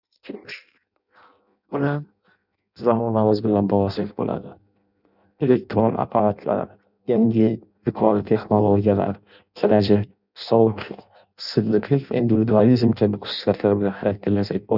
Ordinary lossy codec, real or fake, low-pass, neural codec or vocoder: none; fake; 5.4 kHz; codec, 16 kHz in and 24 kHz out, 0.6 kbps, FireRedTTS-2 codec